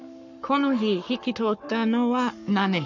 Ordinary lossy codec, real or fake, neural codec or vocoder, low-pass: none; fake; codec, 44.1 kHz, 7.8 kbps, Pupu-Codec; 7.2 kHz